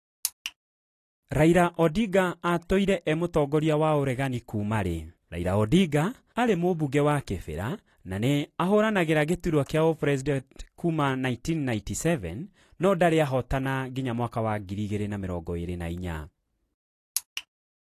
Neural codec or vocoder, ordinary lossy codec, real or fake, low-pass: none; AAC, 48 kbps; real; 14.4 kHz